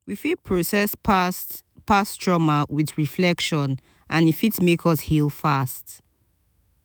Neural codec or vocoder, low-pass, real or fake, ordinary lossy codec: autoencoder, 48 kHz, 128 numbers a frame, DAC-VAE, trained on Japanese speech; none; fake; none